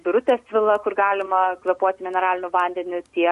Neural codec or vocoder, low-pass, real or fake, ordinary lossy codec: none; 14.4 kHz; real; MP3, 48 kbps